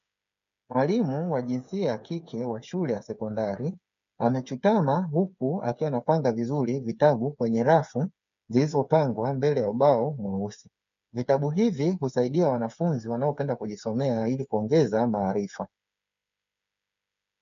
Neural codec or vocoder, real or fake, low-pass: codec, 16 kHz, 8 kbps, FreqCodec, smaller model; fake; 7.2 kHz